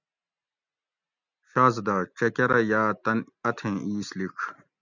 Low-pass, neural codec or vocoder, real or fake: 7.2 kHz; none; real